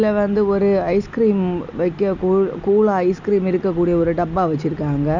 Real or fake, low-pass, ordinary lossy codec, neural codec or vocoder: real; 7.2 kHz; none; none